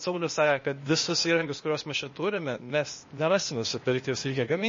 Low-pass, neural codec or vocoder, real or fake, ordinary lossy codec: 7.2 kHz; codec, 16 kHz, 0.8 kbps, ZipCodec; fake; MP3, 32 kbps